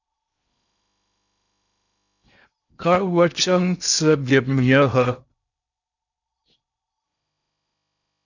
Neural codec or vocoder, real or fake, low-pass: codec, 16 kHz in and 24 kHz out, 0.8 kbps, FocalCodec, streaming, 65536 codes; fake; 7.2 kHz